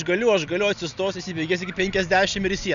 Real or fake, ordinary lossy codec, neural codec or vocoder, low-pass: real; AAC, 96 kbps; none; 7.2 kHz